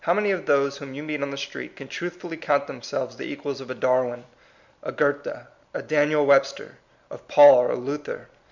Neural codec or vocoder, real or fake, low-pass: none; real; 7.2 kHz